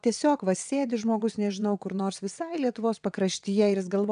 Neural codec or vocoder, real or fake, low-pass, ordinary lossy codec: vocoder, 22.05 kHz, 80 mel bands, WaveNeXt; fake; 9.9 kHz; MP3, 96 kbps